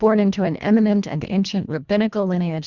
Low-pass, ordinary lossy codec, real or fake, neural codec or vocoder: 7.2 kHz; Opus, 64 kbps; fake; codec, 24 kHz, 1.5 kbps, HILCodec